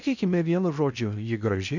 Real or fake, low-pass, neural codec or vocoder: fake; 7.2 kHz; codec, 16 kHz in and 24 kHz out, 0.6 kbps, FocalCodec, streaming, 4096 codes